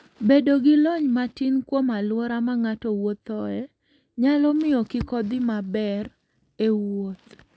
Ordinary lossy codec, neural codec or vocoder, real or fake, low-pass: none; none; real; none